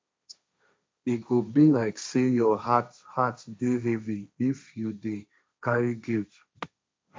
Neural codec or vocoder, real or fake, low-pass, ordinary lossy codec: codec, 16 kHz, 1.1 kbps, Voila-Tokenizer; fake; none; none